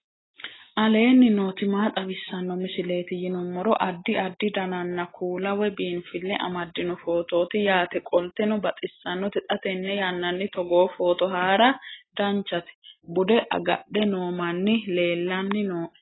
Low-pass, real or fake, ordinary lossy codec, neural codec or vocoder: 7.2 kHz; real; AAC, 16 kbps; none